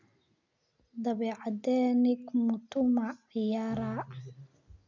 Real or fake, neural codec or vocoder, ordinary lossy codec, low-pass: real; none; none; 7.2 kHz